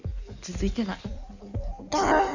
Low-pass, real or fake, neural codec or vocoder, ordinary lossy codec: 7.2 kHz; fake; codec, 16 kHz in and 24 kHz out, 1.1 kbps, FireRedTTS-2 codec; none